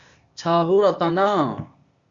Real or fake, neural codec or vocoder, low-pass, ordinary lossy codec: fake; codec, 16 kHz, 0.8 kbps, ZipCodec; 7.2 kHz; Opus, 64 kbps